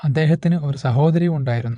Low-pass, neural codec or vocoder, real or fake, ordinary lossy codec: 9.9 kHz; vocoder, 22.05 kHz, 80 mel bands, WaveNeXt; fake; none